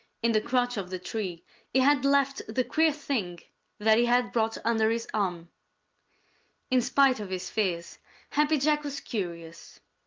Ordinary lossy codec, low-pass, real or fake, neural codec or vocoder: Opus, 32 kbps; 7.2 kHz; real; none